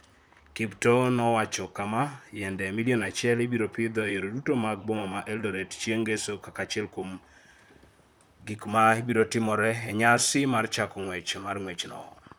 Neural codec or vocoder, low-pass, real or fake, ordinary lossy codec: vocoder, 44.1 kHz, 128 mel bands, Pupu-Vocoder; none; fake; none